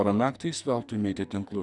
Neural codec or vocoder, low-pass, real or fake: codec, 44.1 kHz, 2.6 kbps, SNAC; 10.8 kHz; fake